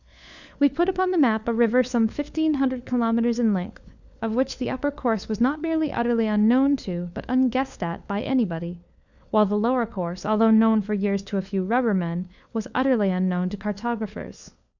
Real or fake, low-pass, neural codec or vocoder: fake; 7.2 kHz; codec, 16 kHz, 4 kbps, FunCodec, trained on LibriTTS, 50 frames a second